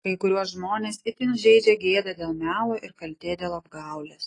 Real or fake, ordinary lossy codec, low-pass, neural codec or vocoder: real; AAC, 32 kbps; 10.8 kHz; none